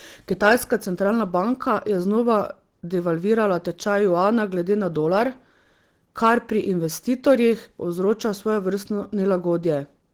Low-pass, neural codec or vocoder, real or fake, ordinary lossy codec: 19.8 kHz; none; real; Opus, 16 kbps